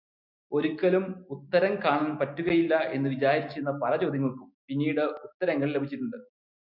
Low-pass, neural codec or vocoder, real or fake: 5.4 kHz; none; real